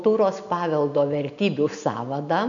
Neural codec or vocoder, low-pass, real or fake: none; 7.2 kHz; real